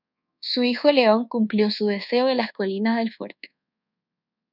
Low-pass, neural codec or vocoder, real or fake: 5.4 kHz; codec, 24 kHz, 1.2 kbps, DualCodec; fake